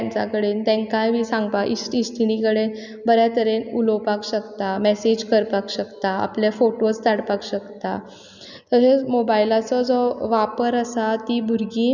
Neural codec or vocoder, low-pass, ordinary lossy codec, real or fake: none; 7.2 kHz; none; real